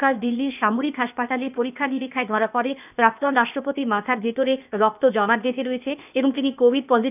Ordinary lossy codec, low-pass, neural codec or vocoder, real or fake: none; 3.6 kHz; codec, 16 kHz, 0.8 kbps, ZipCodec; fake